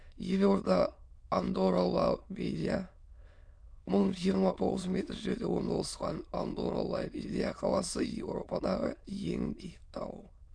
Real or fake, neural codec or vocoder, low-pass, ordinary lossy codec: fake; autoencoder, 22.05 kHz, a latent of 192 numbers a frame, VITS, trained on many speakers; 9.9 kHz; none